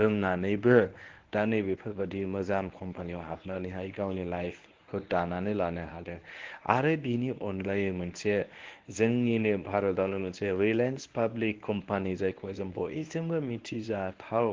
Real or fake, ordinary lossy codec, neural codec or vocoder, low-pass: fake; Opus, 16 kbps; codec, 24 kHz, 0.9 kbps, WavTokenizer, medium speech release version 1; 7.2 kHz